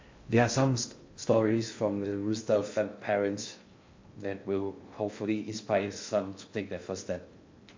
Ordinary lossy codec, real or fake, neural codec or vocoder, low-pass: MP3, 48 kbps; fake; codec, 16 kHz in and 24 kHz out, 0.6 kbps, FocalCodec, streaming, 2048 codes; 7.2 kHz